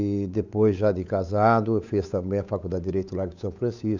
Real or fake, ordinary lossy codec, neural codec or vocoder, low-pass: fake; none; codec, 16 kHz, 8 kbps, FunCodec, trained on Chinese and English, 25 frames a second; 7.2 kHz